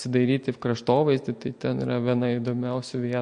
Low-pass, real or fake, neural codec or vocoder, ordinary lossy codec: 9.9 kHz; real; none; MP3, 48 kbps